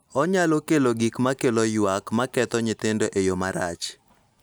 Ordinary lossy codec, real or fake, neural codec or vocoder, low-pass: none; real; none; none